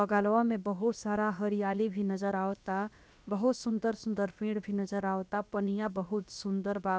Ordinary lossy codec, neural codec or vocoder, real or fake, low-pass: none; codec, 16 kHz, about 1 kbps, DyCAST, with the encoder's durations; fake; none